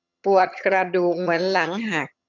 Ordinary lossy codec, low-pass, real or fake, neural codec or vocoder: none; 7.2 kHz; fake; vocoder, 22.05 kHz, 80 mel bands, HiFi-GAN